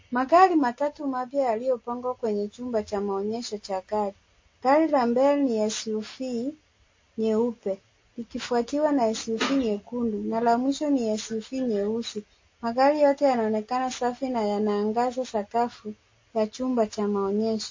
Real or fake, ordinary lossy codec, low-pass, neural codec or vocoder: real; MP3, 32 kbps; 7.2 kHz; none